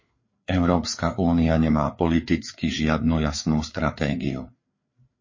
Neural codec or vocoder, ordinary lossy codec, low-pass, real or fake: codec, 16 kHz, 4 kbps, FreqCodec, larger model; MP3, 32 kbps; 7.2 kHz; fake